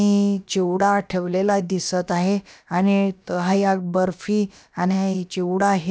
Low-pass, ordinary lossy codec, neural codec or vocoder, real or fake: none; none; codec, 16 kHz, about 1 kbps, DyCAST, with the encoder's durations; fake